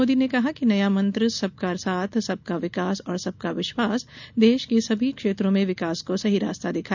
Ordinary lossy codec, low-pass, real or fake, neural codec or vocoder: none; 7.2 kHz; real; none